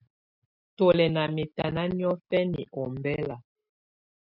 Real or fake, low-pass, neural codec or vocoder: real; 5.4 kHz; none